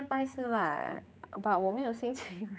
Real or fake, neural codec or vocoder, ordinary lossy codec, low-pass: fake; codec, 16 kHz, 4 kbps, X-Codec, HuBERT features, trained on general audio; none; none